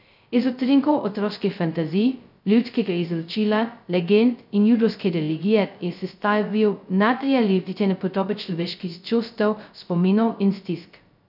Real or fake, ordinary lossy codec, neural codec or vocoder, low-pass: fake; none; codec, 16 kHz, 0.2 kbps, FocalCodec; 5.4 kHz